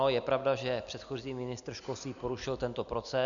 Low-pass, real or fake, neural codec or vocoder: 7.2 kHz; real; none